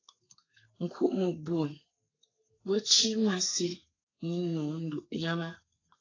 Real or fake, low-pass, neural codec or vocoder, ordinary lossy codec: fake; 7.2 kHz; codec, 32 kHz, 1.9 kbps, SNAC; AAC, 32 kbps